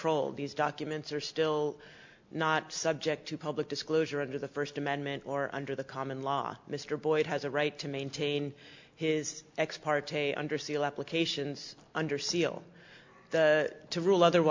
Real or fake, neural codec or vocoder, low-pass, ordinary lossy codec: real; none; 7.2 kHz; MP3, 48 kbps